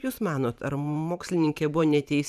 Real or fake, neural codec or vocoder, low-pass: fake; vocoder, 44.1 kHz, 128 mel bands every 512 samples, BigVGAN v2; 14.4 kHz